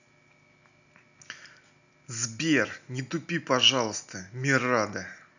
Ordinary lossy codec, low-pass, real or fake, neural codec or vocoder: none; 7.2 kHz; real; none